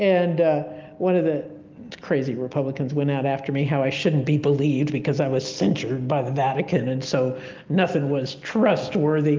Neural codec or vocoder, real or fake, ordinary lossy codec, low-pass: none; real; Opus, 24 kbps; 7.2 kHz